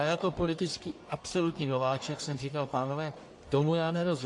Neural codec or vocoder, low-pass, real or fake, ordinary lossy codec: codec, 44.1 kHz, 1.7 kbps, Pupu-Codec; 10.8 kHz; fake; AAC, 48 kbps